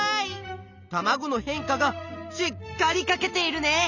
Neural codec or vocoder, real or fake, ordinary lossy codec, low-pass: none; real; none; 7.2 kHz